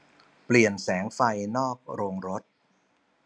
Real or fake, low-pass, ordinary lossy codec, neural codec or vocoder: real; 9.9 kHz; none; none